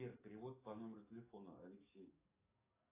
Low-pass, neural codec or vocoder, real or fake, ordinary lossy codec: 3.6 kHz; codec, 44.1 kHz, 7.8 kbps, DAC; fake; AAC, 24 kbps